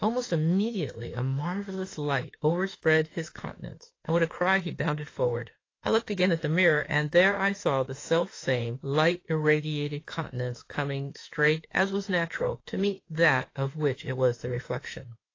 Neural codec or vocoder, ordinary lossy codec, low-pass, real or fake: autoencoder, 48 kHz, 32 numbers a frame, DAC-VAE, trained on Japanese speech; AAC, 32 kbps; 7.2 kHz; fake